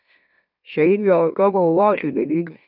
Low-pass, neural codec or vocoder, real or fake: 5.4 kHz; autoencoder, 44.1 kHz, a latent of 192 numbers a frame, MeloTTS; fake